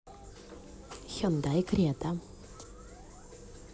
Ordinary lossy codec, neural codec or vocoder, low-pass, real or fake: none; none; none; real